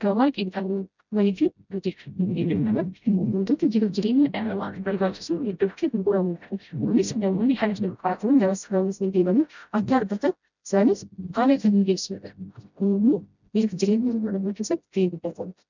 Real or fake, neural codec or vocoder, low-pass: fake; codec, 16 kHz, 0.5 kbps, FreqCodec, smaller model; 7.2 kHz